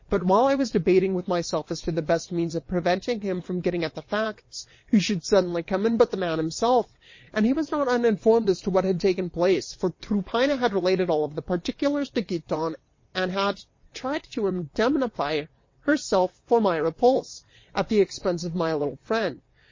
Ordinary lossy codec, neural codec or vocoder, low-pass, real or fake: MP3, 32 kbps; vocoder, 22.05 kHz, 80 mel bands, WaveNeXt; 7.2 kHz; fake